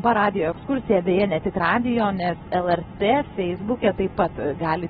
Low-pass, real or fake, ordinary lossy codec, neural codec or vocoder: 19.8 kHz; real; AAC, 16 kbps; none